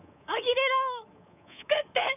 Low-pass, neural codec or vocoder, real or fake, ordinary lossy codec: 3.6 kHz; codec, 24 kHz, 3.1 kbps, DualCodec; fake; none